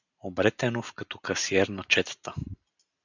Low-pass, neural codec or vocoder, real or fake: 7.2 kHz; none; real